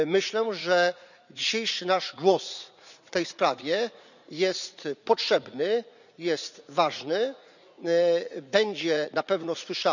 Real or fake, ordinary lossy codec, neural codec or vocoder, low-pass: fake; none; vocoder, 44.1 kHz, 80 mel bands, Vocos; 7.2 kHz